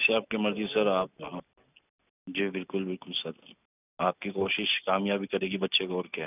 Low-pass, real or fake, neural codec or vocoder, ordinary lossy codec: 3.6 kHz; real; none; none